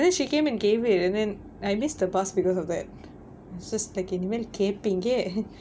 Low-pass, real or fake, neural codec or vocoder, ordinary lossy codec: none; real; none; none